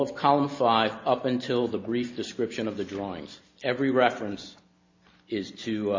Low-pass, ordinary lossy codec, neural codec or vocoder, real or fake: 7.2 kHz; MP3, 32 kbps; none; real